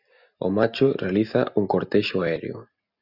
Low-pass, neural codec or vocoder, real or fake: 5.4 kHz; none; real